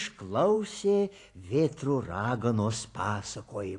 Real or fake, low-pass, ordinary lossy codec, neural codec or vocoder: fake; 10.8 kHz; AAC, 48 kbps; vocoder, 44.1 kHz, 128 mel bands every 512 samples, BigVGAN v2